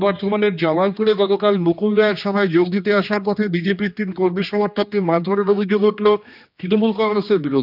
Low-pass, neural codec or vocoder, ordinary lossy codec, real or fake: 5.4 kHz; codec, 16 kHz, 2 kbps, X-Codec, HuBERT features, trained on general audio; none; fake